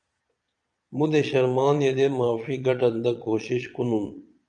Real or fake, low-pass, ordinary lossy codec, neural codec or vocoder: fake; 9.9 kHz; MP3, 96 kbps; vocoder, 22.05 kHz, 80 mel bands, Vocos